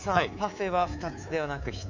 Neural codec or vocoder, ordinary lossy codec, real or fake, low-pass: codec, 24 kHz, 3.1 kbps, DualCodec; none; fake; 7.2 kHz